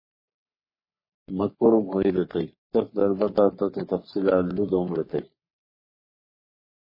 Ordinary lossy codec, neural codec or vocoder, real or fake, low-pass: MP3, 24 kbps; codec, 44.1 kHz, 3.4 kbps, Pupu-Codec; fake; 5.4 kHz